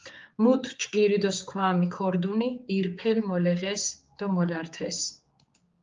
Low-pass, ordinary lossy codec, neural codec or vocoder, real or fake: 7.2 kHz; Opus, 32 kbps; codec, 16 kHz, 4 kbps, X-Codec, HuBERT features, trained on general audio; fake